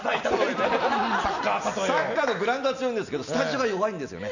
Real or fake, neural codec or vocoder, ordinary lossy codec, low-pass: real; none; none; 7.2 kHz